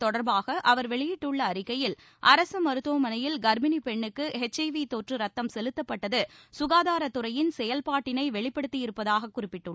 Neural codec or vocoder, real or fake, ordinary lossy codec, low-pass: none; real; none; none